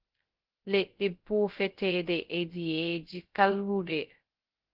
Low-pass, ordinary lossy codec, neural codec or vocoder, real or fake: 5.4 kHz; Opus, 16 kbps; codec, 16 kHz, 0.2 kbps, FocalCodec; fake